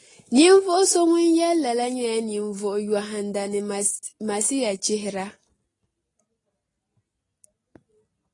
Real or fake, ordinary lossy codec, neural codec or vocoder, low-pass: real; AAC, 32 kbps; none; 10.8 kHz